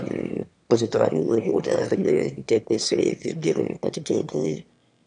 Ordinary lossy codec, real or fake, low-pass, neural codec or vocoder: none; fake; 9.9 kHz; autoencoder, 22.05 kHz, a latent of 192 numbers a frame, VITS, trained on one speaker